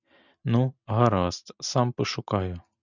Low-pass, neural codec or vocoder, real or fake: 7.2 kHz; none; real